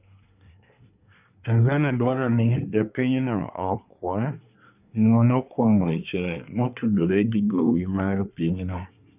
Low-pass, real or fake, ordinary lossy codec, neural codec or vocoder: 3.6 kHz; fake; none; codec, 24 kHz, 1 kbps, SNAC